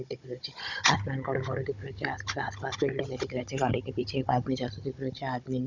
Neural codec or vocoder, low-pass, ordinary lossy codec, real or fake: codec, 16 kHz, 16 kbps, FunCodec, trained on Chinese and English, 50 frames a second; 7.2 kHz; none; fake